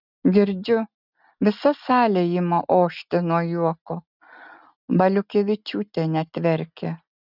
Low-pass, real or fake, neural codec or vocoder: 5.4 kHz; real; none